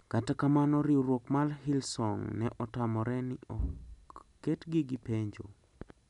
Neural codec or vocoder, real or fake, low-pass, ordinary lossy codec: none; real; 10.8 kHz; none